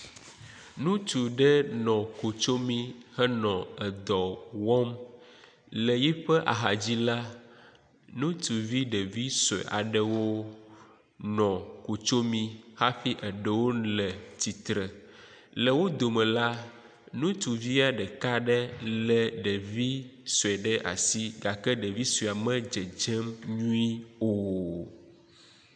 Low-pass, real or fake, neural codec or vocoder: 9.9 kHz; real; none